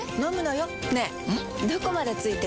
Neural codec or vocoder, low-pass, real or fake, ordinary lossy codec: none; none; real; none